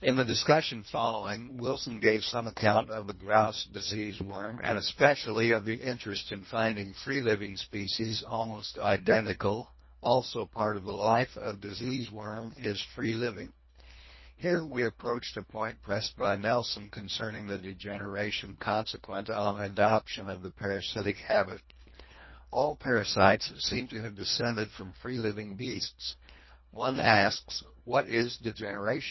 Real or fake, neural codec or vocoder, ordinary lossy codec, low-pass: fake; codec, 24 kHz, 1.5 kbps, HILCodec; MP3, 24 kbps; 7.2 kHz